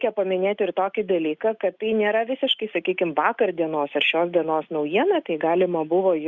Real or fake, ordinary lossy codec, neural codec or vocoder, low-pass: real; Opus, 64 kbps; none; 7.2 kHz